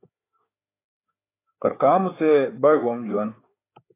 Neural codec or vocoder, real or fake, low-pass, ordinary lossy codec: codec, 16 kHz, 4 kbps, FreqCodec, larger model; fake; 3.6 kHz; AAC, 24 kbps